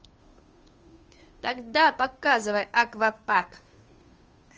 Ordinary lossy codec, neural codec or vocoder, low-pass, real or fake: Opus, 24 kbps; codec, 16 kHz, 2 kbps, FunCodec, trained on Chinese and English, 25 frames a second; 7.2 kHz; fake